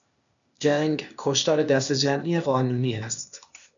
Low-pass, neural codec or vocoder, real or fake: 7.2 kHz; codec, 16 kHz, 0.8 kbps, ZipCodec; fake